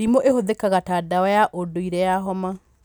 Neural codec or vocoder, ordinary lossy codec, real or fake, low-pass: none; none; real; 19.8 kHz